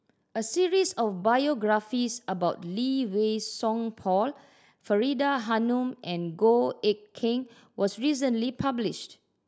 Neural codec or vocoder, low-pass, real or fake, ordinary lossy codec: none; none; real; none